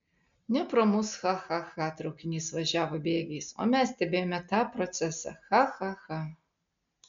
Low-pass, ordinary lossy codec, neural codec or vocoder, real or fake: 7.2 kHz; MP3, 64 kbps; none; real